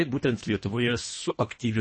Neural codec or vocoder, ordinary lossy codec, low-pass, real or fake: codec, 24 kHz, 1.5 kbps, HILCodec; MP3, 32 kbps; 9.9 kHz; fake